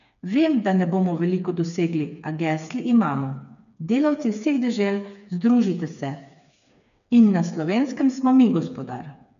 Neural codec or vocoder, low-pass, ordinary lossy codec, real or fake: codec, 16 kHz, 4 kbps, FreqCodec, smaller model; 7.2 kHz; none; fake